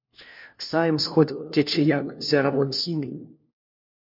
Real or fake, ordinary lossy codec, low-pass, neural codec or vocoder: fake; MP3, 32 kbps; 5.4 kHz; codec, 16 kHz, 1 kbps, FunCodec, trained on LibriTTS, 50 frames a second